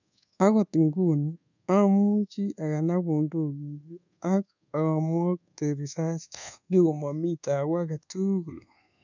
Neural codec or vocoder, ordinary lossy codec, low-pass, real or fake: codec, 24 kHz, 1.2 kbps, DualCodec; none; 7.2 kHz; fake